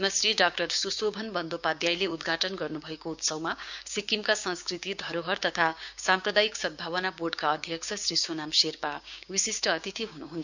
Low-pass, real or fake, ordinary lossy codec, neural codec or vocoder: 7.2 kHz; fake; none; codec, 16 kHz, 6 kbps, DAC